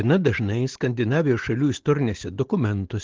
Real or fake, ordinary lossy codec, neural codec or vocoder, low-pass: real; Opus, 16 kbps; none; 7.2 kHz